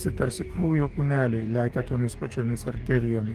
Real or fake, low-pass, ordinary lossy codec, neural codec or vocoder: fake; 14.4 kHz; Opus, 24 kbps; codec, 44.1 kHz, 2.6 kbps, DAC